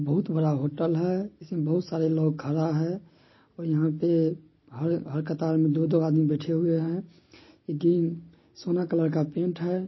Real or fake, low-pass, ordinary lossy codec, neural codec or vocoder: real; 7.2 kHz; MP3, 24 kbps; none